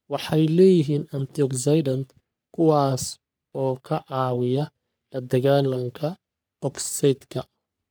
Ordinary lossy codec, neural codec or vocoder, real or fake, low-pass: none; codec, 44.1 kHz, 3.4 kbps, Pupu-Codec; fake; none